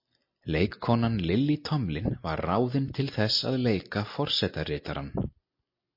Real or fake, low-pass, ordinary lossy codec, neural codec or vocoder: real; 5.4 kHz; MP3, 32 kbps; none